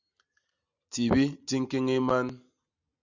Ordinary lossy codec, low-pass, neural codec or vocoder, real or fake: Opus, 64 kbps; 7.2 kHz; none; real